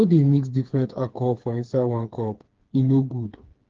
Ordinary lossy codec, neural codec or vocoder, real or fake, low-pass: Opus, 16 kbps; codec, 16 kHz, 4 kbps, FreqCodec, smaller model; fake; 7.2 kHz